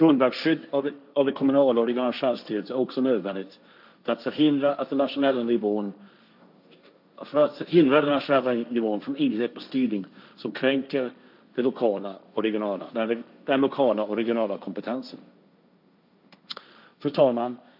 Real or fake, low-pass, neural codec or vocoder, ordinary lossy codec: fake; 5.4 kHz; codec, 16 kHz, 1.1 kbps, Voila-Tokenizer; none